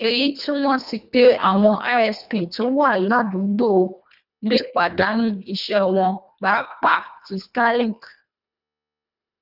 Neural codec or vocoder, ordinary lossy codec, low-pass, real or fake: codec, 24 kHz, 1.5 kbps, HILCodec; none; 5.4 kHz; fake